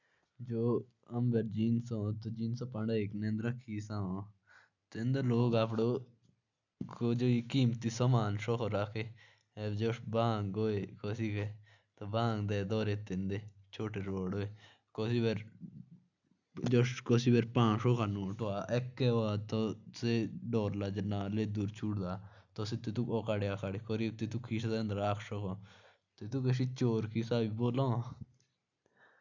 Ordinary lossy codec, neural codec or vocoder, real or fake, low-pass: none; none; real; 7.2 kHz